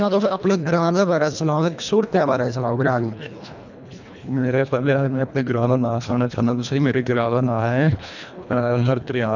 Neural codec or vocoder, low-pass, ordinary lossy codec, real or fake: codec, 24 kHz, 1.5 kbps, HILCodec; 7.2 kHz; none; fake